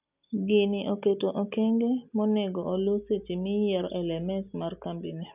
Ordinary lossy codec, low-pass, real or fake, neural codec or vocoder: none; 3.6 kHz; real; none